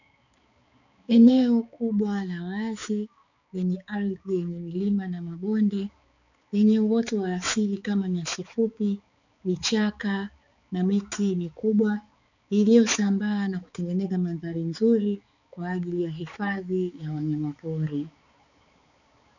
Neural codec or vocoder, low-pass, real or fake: codec, 16 kHz, 4 kbps, X-Codec, HuBERT features, trained on balanced general audio; 7.2 kHz; fake